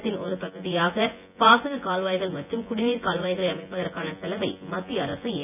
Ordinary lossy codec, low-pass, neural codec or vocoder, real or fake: none; 3.6 kHz; vocoder, 24 kHz, 100 mel bands, Vocos; fake